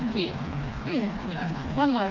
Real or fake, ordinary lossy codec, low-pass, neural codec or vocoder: fake; none; 7.2 kHz; codec, 16 kHz, 2 kbps, FreqCodec, smaller model